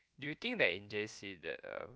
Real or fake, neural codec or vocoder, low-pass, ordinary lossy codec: fake; codec, 16 kHz, about 1 kbps, DyCAST, with the encoder's durations; none; none